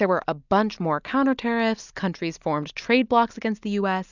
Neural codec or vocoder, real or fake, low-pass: none; real; 7.2 kHz